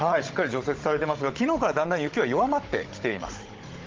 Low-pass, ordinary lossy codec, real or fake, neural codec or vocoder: 7.2 kHz; Opus, 16 kbps; fake; codec, 16 kHz, 16 kbps, FunCodec, trained on Chinese and English, 50 frames a second